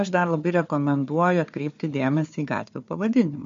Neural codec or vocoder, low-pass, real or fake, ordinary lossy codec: codec, 16 kHz, 4 kbps, FunCodec, trained on Chinese and English, 50 frames a second; 7.2 kHz; fake; MP3, 48 kbps